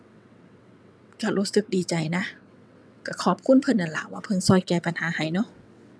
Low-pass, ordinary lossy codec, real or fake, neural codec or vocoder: none; none; fake; vocoder, 22.05 kHz, 80 mel bands, WaveNeXt